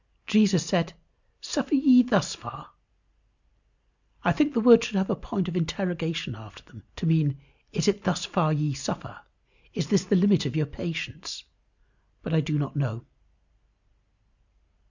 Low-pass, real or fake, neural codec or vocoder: 7.2 kHz; real; none